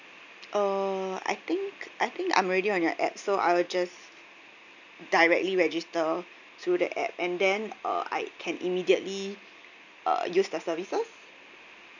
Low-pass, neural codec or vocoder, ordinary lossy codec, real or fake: 7.2 kHz; none; none; real